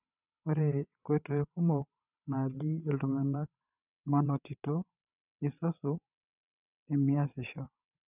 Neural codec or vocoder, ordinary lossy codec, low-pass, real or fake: vocoder, 22.05 kHz, 80 mel bands, WaveNeXt; none; 3.6 kHz; fake